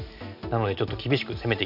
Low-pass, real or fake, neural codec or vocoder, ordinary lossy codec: 5.4 kHz; real; none; none